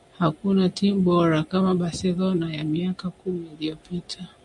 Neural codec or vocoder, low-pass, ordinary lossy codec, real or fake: none; 10.8 kHz; MP3, 96 kbps; real